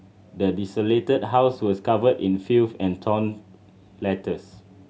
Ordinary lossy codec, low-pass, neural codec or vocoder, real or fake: none; none; none; real